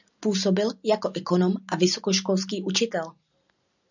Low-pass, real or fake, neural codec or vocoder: 7.2 kHz; real; none